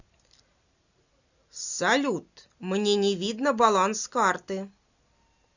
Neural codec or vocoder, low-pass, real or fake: none; 7.2 kHz; real